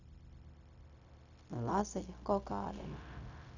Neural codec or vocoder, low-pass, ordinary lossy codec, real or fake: codec, 16 kHz, 0.4 kbps, LongCat-Audio-Codec; 7.2 kHz; none; fake